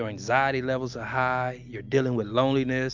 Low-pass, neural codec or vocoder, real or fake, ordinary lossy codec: 7.2 kHz; none; real; MP3, 64 kbps